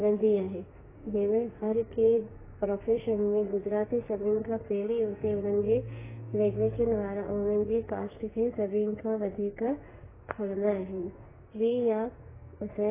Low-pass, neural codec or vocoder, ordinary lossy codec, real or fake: 3.6 kHz; codec, 44.1 kHz, 2.6 kbps, SNAC; AAC, 16 kbps; fake